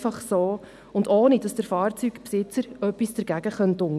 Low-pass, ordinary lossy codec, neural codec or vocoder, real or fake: none; none; none; real